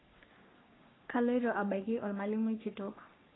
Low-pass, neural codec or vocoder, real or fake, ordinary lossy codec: 7.2 kHz; codec, 44.1 kHz, 3.4 kbps, Pupu-Codec; fake; AAC, 16 kbps